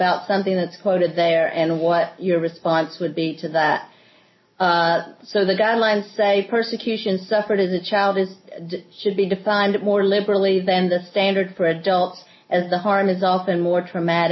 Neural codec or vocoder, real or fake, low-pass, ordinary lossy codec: none; real; 7.2 kHz; MP3, 24 kbps